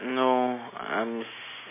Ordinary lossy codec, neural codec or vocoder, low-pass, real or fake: MP3, 16 kbps; none; 3.6 kHz; real